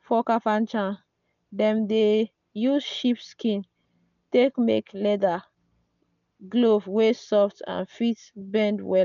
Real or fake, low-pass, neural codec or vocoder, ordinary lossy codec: real; 7.2 kHz; none; none